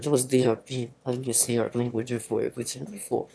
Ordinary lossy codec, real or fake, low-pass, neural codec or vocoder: none; fake; none; autoencoder, 22.05 kHz, a latent of 192 numbers a frame, VITS, trained on one speaker